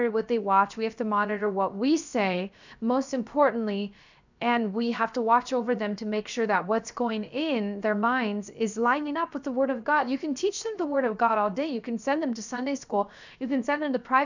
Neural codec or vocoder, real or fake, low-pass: codec, 16 kHz, 0.7 kbps, FocalCodec; fake; 7.2 kHz